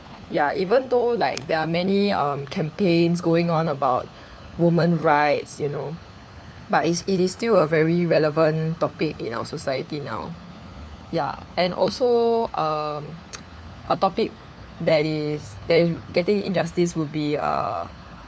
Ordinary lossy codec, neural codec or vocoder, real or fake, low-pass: none; codec, 16 kHz, 4 kbps, FunCodec, trained on LibriTTS, 50 frames a second; fake; none